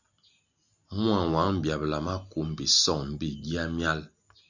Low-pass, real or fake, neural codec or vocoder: 7.2 kHz; real; none